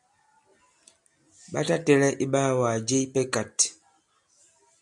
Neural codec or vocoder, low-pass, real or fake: none; 10.8 kHz; real